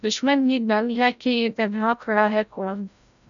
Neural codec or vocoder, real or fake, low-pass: codec, 16 kHz, 0.5 kbps, FreqCodec, larger model; fake; 7.2 kHz